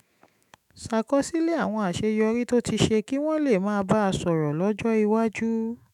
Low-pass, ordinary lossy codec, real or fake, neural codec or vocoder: 19.8 kHz; none; fake; autoencoder, 48 kHz, 128 numbers a frame, DAC-VAE, trained on Japanese speech